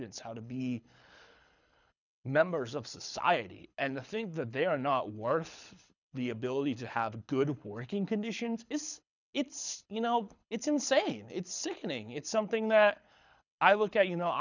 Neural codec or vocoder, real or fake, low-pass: codec, 24 kHz, 6 kbps, HILCodec; fake; 7.2 kHz